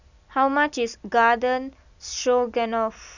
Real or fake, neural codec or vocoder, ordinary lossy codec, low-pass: real; none; none; 7.2 kHz